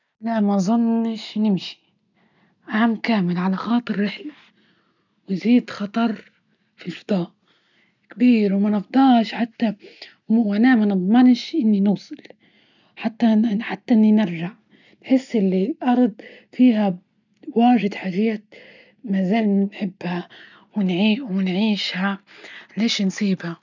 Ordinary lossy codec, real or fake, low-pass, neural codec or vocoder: none; real; 7.2 kHz; none